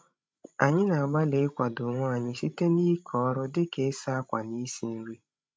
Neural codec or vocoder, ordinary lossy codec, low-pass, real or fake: codec, 16 kHz, 16 kbps, FreqCodec, larger model; none; none; fake